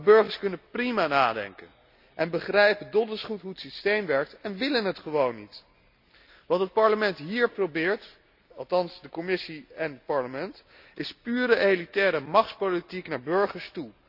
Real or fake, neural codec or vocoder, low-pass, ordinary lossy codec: real; none; 5.4 kHz; none